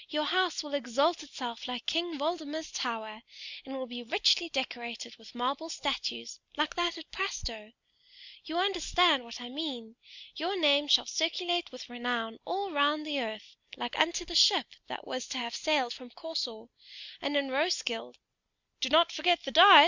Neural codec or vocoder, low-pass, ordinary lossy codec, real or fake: none; 7.2 kHz; Opus, 64 kbps; real